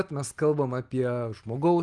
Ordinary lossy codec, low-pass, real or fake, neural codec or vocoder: Opus, 24 kbps; 10.8 kHz; real; none